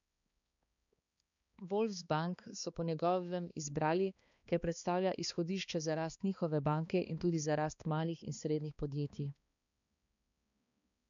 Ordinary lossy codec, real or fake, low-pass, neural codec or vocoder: none; fake; 7.2 kHz; codec, 16 kHz, 2 kbps, X-Codec, HuBERT features, trained on balanced general audio